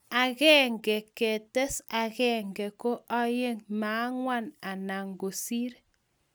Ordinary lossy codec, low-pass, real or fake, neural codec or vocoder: none; none; real; none